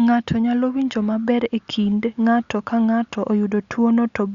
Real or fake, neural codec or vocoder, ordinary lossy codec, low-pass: real; none; Opus, 64 kbps; 7.2 kHz